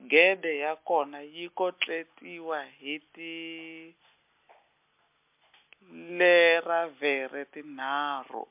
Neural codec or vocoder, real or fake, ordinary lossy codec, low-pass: none; real; MP3, 32 kbps; 3.6 kHz